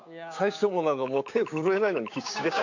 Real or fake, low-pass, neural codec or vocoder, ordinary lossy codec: fake; 7.2 kHz; codec, 16 kHz, 6 kbps, DAC; none